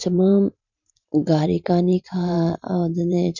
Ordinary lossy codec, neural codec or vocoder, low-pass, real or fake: none; vocoder, 44.1 kHz, 128 mel bands every 512 samples, BigVGAN v2; 7.2 kHz; fake